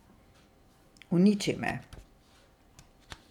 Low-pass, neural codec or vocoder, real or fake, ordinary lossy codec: 19.8 kHz; vocoder, 44.1 kHz, 128 mel bands every 512 samples, BigVGAN v2; fake; none